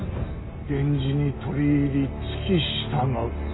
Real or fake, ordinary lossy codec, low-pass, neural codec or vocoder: real; AAC, 16 kbps; 7.2 kHz; none